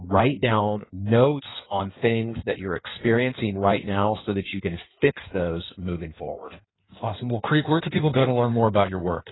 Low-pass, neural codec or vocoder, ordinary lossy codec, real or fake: 7.2 kHz; codec, 16 kHz in and 24 kHz out, 1.1 kbps, FireRedTTS-2 codec; AAC, 16 kbps; fake